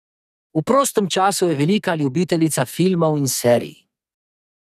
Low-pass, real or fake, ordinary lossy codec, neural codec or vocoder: 14.4 kHz; fake; none; codec, 44.1 kHz, 2.6 kbps, SNAC